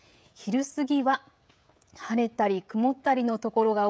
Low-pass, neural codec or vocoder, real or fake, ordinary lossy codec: none; codec, 16 kHz, 16 kbps, FreqCodec, smaller model; fake; none